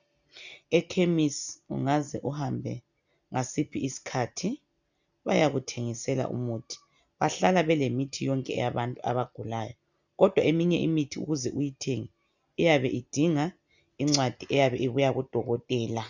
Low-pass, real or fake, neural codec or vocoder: 7.2 kHz; real; none